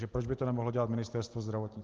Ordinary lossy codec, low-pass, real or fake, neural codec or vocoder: Opus, 16 kbps; 7.2 kHz; real; none